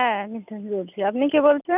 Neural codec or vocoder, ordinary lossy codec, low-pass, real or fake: none; none; 3.6 kHz; real